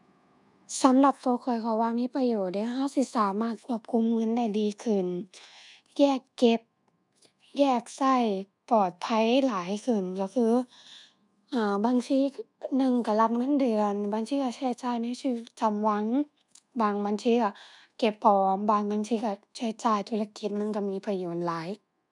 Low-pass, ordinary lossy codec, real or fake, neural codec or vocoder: 10.8 kHz; none; fake; codec, 24 kHz, 1.2 kbps, DualCodec